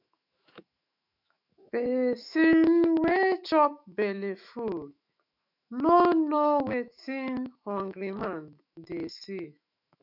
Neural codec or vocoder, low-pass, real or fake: autoencoder, 48 kHz, 128 numbers a frame, DAC-VAE, trained on Japanese speech; 5.4 kHz; fake